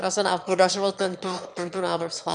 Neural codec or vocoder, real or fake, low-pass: autoencoder, 22.05 kHz, a latent of 192 numbers a frame, VITS, trained on one speaker; fake; 9.9 kHz